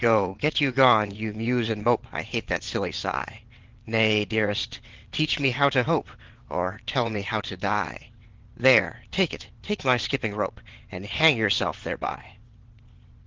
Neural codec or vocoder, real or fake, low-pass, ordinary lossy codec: vocoder, 22.05 kHz, 80 mel bands, WaveNeXt; fake; 7.2 kHz; Opus, 16 kbps